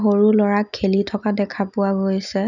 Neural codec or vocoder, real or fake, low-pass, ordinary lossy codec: none; real; 7.2 kHz; none